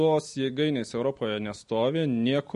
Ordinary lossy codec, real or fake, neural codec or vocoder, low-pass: MP3, 48 kbps; real; none; 10.8 kHz